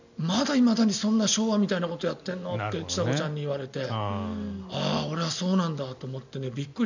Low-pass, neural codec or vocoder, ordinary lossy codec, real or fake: 7.2 kHz; none; none; real